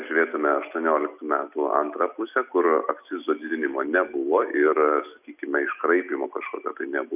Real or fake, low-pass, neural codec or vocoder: real; 3.6 kHz; none